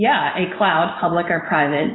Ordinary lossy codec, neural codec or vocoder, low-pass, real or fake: AAC, 16 kbps; none; 7.2 kHz; real